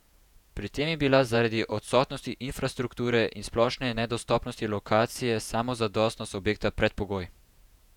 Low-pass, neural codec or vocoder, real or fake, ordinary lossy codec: 19.8 kHz; vocoder, 48 kHz, 128 mel bands, Vocos; fake; none